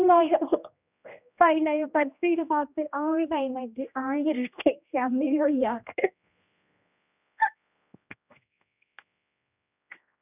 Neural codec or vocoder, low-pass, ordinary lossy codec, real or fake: codec, 16 kHz, 1 kbps, X-Codec, HuBERT features, trained on general audio; 3.6 kHz; none; fake